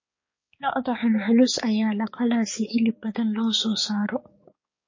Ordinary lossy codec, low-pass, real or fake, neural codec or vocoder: MP3, 32 kbps; 7.2 kHz; fake; codec, 16 kHz, 4 kbps, X-Codec, HuBERT features, trained on balanced general audio